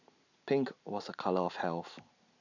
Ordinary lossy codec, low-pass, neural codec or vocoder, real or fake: none; 7.2 kHz; none; real